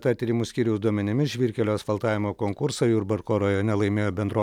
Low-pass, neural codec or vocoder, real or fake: 19.8 kHz; none; real